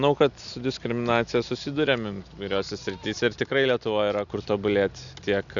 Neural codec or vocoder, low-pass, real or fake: none; 7.2 kHz; real